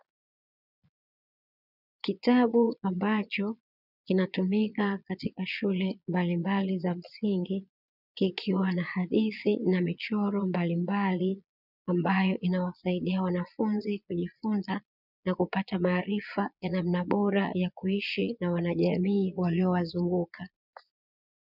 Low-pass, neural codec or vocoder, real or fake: 5.4 kHz; vocoder, 22.05 kHz, 80 mel bands, Vocos; fake